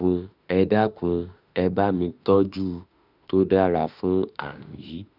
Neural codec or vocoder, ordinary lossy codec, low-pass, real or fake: autoencoder, 48 kHz, 32 numbers a frame, DAC-VAE, trained on Japanese speech; none; 5.4 kHz; fake